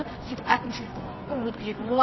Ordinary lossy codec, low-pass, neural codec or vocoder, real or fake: MP3, 24 kbps; 7.2 kHz; codec, 24 kHz, 0.9 kbps, WavTokenizer, medium music audio release; fake